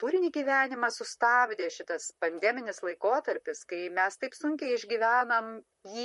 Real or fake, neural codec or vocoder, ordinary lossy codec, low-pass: fake; vocoder, 44.1 kHz, 128 mel bands, Pupu-Vocoder; MP3, 48 kbps; 14.4 kHz